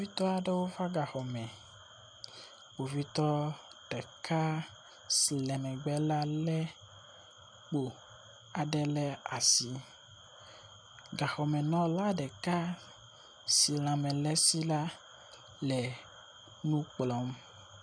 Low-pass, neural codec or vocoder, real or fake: 9.9 kHz; none; real